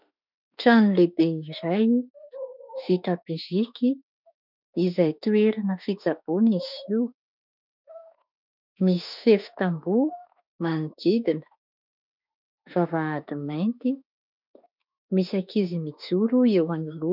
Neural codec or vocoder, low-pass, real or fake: autoencoder, 48 kHz, 32 numbers a frame, DAC-VAE, trained on Japanese speech; 5.4 kHz; fake